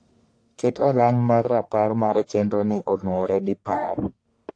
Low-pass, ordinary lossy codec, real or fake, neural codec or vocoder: 9.9 kHz; AAC, 48 kbps; fake; codec, 44.1 kHz, 1.7 kbps, Pupu-Codec